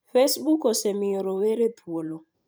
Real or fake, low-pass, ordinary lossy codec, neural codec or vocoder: fake; none; none; vocoder, 44.1 kHz, 128 mel bands every 512 samples, BigVGAN v2